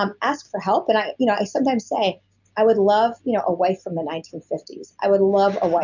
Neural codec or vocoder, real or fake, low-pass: none; real; 7.2 kHz